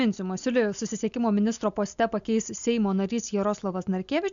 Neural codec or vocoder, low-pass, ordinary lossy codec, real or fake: none; 7.2 kHz; MP3, 96 kbps; real